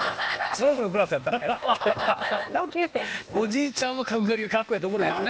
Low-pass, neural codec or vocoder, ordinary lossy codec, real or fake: none; codec, 16 kHz, 0.8 kbps, ZipCodec; none; fake